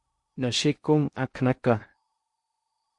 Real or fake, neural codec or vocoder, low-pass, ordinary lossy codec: fake; codec, 16 kHz in and 24 kHz out, 0.6 kbps, FocalCodec, streaming, 2048 codes; 10.8 kHz; MP3, 48 kbps